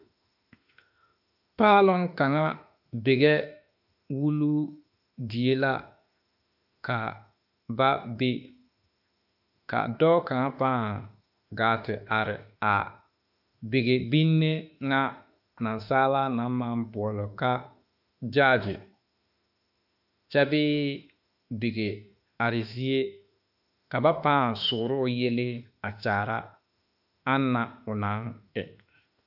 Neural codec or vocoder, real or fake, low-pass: autoencoder, 48 kHz, 32 numbers a frame, DAC-VAE, trained on Japanese speech; fake; 5.4 kHz